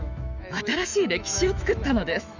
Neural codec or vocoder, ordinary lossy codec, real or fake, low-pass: autoencoder, 48 kHz, 128 numbers a frame, DAC-VAE, trained on Japanese speech; none; fake; 7.2 kHz